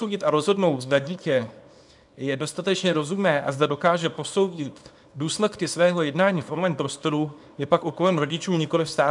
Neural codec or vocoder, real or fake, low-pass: codec, 24 kHz, 0.9 kbps, WavTokenizer, small release; fake; 10.8 kHz